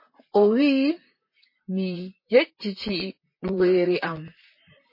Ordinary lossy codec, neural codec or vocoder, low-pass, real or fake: MP3, 24 kbps; vocoder, 44.1 kHz, 128 mel bands, Pupu-Vocoder; 5.4 kHz; fake